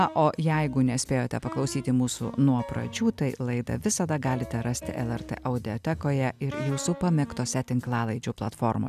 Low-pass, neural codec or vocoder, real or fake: 14.4 kHz; none; real